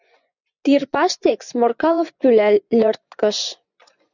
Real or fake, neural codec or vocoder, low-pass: fake; vocoder, 24 kHz, 100 mel bands, Vocos; 7.2 kHz